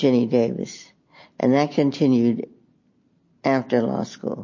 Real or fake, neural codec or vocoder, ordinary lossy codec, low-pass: real; none; MP3, 32 kbps; 7.2 kHz